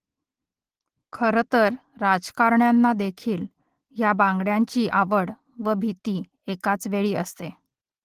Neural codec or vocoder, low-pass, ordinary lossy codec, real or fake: none; 14.4 kHz; Opus, 16 kbps; real